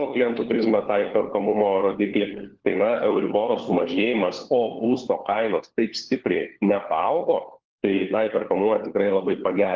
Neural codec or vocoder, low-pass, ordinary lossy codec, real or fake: codec, 16 kHz, 4 kbps, FunCodec, trained on LibriTTS, 50 frames a second; 7.2 kHz; Opus, 16 kbps; fake